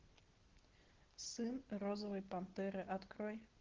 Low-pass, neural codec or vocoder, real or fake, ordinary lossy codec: 7.2 kHz; none; real; Opus, 16 kbps